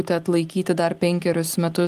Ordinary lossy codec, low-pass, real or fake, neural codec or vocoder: Opus, 24 kbps; 14.4 kHz; real; none